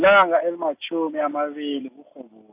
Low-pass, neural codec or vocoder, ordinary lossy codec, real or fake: 3.6 kHz; none; AAC, 24 kbps; real